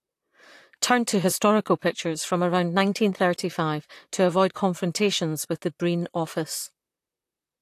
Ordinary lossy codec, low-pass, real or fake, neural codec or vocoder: AAC, 64 kbps; 14.4 kHz; fake; vocoder, 44.1 kHz, 128 mel bands, Pupu-Vocoder